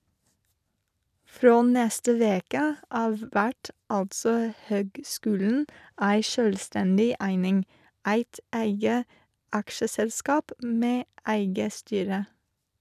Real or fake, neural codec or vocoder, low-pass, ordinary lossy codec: real; none; 14.4 kHz; none